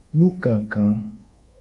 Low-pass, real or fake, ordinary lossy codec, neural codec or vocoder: 10.8 kHz; fake; MP3, 64 kbps; codec, 24 kHz, 1.2 kbps, DualCodec